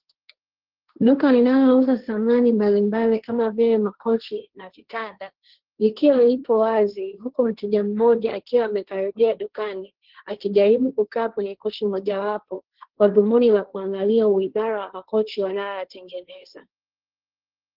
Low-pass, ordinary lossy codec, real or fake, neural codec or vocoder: 5.4 kHz; Opus, 16 kbps; fake; codec, 16 kHz, 1.1 kbps, Voila-Tokenizer